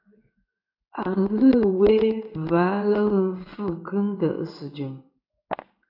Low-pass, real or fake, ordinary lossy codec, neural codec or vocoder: 5.4 kHz; fake; AAC, 48 kbps; codec, 16 kHz in and 24 kHz out, 1 kbps, XY-Tokenizer